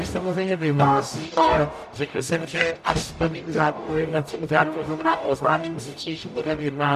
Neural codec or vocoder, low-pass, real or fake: codec, 44.1 kHz, 0.9 kbps, DAC; 14.4 kHz; fake